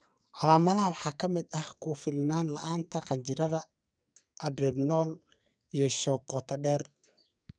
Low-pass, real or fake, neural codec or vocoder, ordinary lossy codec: 9.9 kHz; fake; codec, 44.1 kHz, 2.6 kbps, SNAC; none